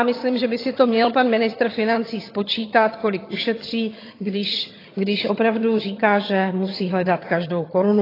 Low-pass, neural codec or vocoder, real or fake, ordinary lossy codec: 5.4 kHz; vocoder, 22.05 kHz, 80 mel bands, HiFi-GAN; fake; AAC, 24 kbps